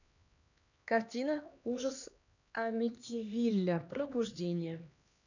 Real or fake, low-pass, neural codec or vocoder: fake; 7.2 kHz; codec, 16 kHz, 2 kbps, X-Codec, HuBERT features, trained on LibriSpeech